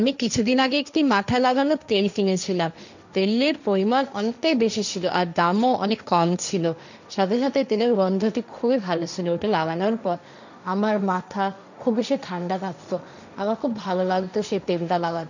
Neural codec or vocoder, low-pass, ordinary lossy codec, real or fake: codec, 16 kHz, 1.1 kbps, Voila-Tokenizer; 7.2 kHz; none; fake